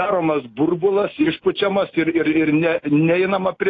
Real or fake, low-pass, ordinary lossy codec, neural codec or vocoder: real; 7.2 kHz; AAC, 32 kbps; none